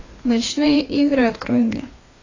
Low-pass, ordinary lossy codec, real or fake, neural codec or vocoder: 7.2 kHz; AAC, 32 kbps; fake; codec, 16 kHz, 2 kbps, FreqCodec, larger model